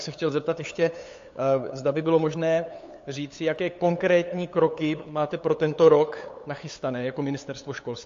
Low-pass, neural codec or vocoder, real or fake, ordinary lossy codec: 7.2 kHz; codec, 16 kHz, 8 kbps, FunCodec, trained on LibriTTS, 25 frames a second; fake; AAC, 48 kbps